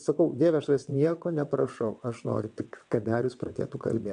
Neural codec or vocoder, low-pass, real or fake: vocoder, 22.05 kHz, 80 mel bands, WaveNeXt; 9.9 kHz; fake